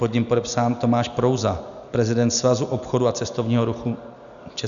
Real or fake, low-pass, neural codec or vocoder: real; 7.2 kHz; none